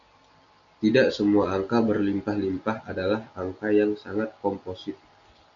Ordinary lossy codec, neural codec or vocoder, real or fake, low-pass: AAC, 64 kbps; none; real; 7.2 kHz